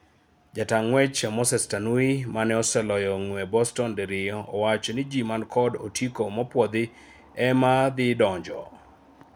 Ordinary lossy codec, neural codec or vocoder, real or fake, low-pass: none; none; real; none